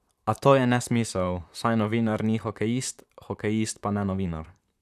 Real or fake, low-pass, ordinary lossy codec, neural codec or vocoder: fake; 14.4 kHz; none; vocoder, 44.1 kHz, 128 mel bands, Pupu-Vocoder